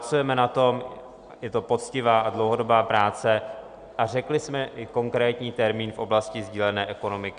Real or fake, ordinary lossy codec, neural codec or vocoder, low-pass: real; Opus, 64 kbps; none; 9.9 kHz